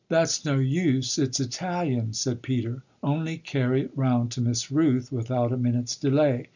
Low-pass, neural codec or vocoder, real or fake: 7.2 kHz; none; real